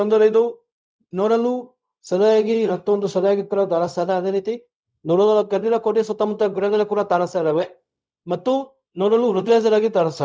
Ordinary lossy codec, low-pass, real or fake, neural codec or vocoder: none; none; fake; codec, 16 kHz, 0.4 kbps, LongCat-Audio-Codec